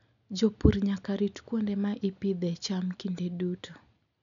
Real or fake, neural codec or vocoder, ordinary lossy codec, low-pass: real; none; none; 7.2 kHz